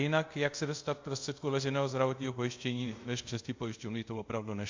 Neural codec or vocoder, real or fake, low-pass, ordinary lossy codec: codec, 24 kHz, 0.5 kbps, DualCodec; fake; 7.2 kHz; MP3, 48 kbps